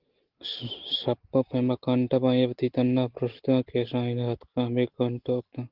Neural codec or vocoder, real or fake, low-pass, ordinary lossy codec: none; real; 5.4 kHz; Opus, 16 kbps